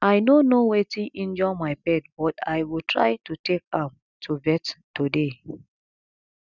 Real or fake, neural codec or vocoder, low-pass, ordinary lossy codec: real; none; 7.2 kHz; none